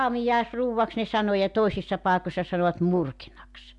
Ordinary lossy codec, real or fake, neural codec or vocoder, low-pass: MP3, 64 kbps; real; none; 10.8 kHz